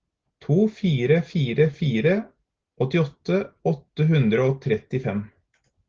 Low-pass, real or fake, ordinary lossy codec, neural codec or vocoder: 7.2 kHz; real; Opus, 16 kbps; none